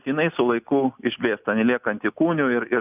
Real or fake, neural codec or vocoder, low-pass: real; none; 3.6 kHz